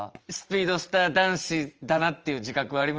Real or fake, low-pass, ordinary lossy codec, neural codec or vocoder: fake; 7.2 kHz; Opus, 24 kbps; vocoder, 44.1 kHz, 80 mel bands, Vocos